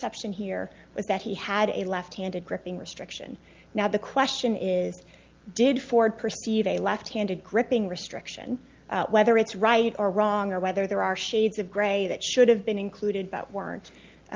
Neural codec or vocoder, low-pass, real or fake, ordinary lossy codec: none; 7.2 kHz; real; Opus, 32 kbps